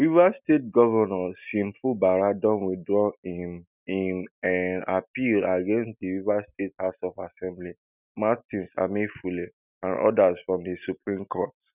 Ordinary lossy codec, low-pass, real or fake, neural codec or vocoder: none; 3.6 kHz; real; none